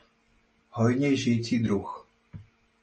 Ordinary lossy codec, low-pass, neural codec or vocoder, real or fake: MP3, 32 kbps; 10.8 kHz; none; real